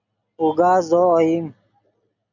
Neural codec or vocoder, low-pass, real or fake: none; 7.2 kHz; real